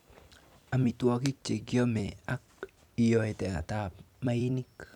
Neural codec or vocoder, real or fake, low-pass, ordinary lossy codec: vocoder, 44.1 kHz, 128 mel bands every 256 samples, BigVGAN v2; fake; 19.8 kHz; none